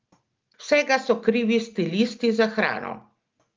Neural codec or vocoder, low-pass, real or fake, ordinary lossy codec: none; 7.2 kHz; real; Opus, 32 kbps